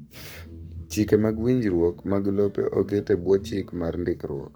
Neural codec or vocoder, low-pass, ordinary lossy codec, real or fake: codec, 44.1 kHz, 7.8 kbps, Pupu-Codec; none; none; fake